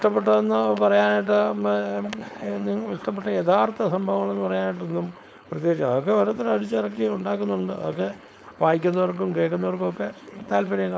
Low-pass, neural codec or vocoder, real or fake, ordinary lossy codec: none; codec, 16 kHz, 4.8 kbps, FACodec; fake; none